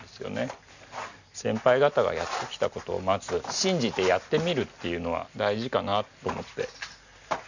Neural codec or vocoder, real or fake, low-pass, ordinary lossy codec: none; real; 7.2 kHz; none